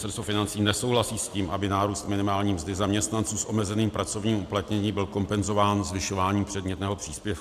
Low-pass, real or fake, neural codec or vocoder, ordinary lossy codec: 14.4 kHz; real; none; AAC, 96 kbps